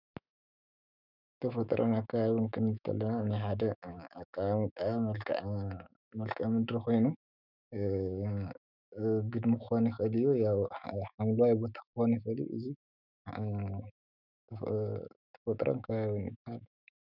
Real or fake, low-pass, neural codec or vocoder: fake; 5.4 kHz; autoencoder, 48 kHz, 128 numbers a frame, DAC-VAE, trained on Japanese speech